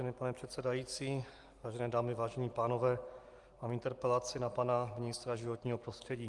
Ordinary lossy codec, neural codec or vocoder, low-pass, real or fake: Opus, 24 kbps; none; 10.8 kHz; real